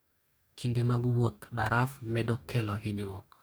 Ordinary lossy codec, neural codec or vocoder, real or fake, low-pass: none; codec, 44.1 kHz, 2.6 kbps, DAC; fake; none